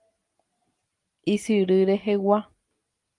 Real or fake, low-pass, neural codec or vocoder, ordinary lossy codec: real; 10.8 kHz; none; Opus, 32 kbps